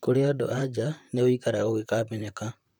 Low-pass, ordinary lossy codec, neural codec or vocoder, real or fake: 19.8 kHz; none; vocoder, 44.1 kHz, 128 mel bands, Pupu-Vocoder; fake